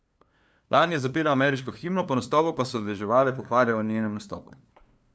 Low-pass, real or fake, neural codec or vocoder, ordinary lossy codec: none; fake; codec, 16 kHz, 2 kbps, FunCodec, trained on LibriTTS, 25 frames a second; none